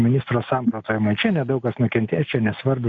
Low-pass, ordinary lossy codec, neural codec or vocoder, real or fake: 7.2 kHz; MP3, 64 kbps; none; real